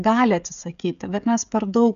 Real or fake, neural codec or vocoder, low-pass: fake; codec, 16 kHz, 16 kbps, FreqCodec, smaller model; 7.2 kHz